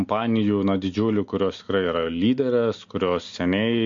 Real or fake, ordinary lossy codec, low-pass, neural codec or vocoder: real; MP3, 48 kbps; 7.2 kHz; none